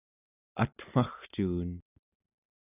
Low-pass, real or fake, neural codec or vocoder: 3.6 kHz; real; none